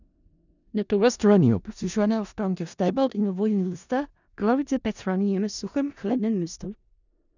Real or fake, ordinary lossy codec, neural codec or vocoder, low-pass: fake; none; codec, 16 kHz in and 24 kHz out, 0.4 kbps, LongCat-Audio-Codec, four codebook decoder; 7.2 kHz